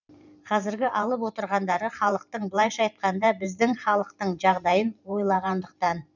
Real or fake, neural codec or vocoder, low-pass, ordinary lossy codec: fake; vocoder, 44.1 kHz, 128 mel bands every 512 samples, BigVGAN v2; 7.2 kHz; none